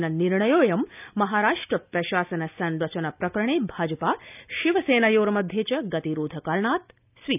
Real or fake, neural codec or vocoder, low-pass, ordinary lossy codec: real; none; 3.6 kHz; none